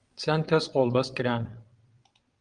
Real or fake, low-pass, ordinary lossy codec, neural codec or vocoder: fake; 9.9 kHz; Opus, 32 kbps; vocoder, 22.05 kHz, 80 mel bands, WaveNeXt